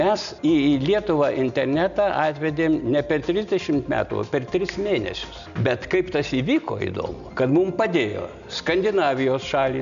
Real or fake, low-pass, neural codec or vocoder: real; 7.2 kHz; none